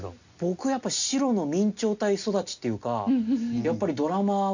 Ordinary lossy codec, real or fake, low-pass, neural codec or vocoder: none; real; 7.2 kHz; none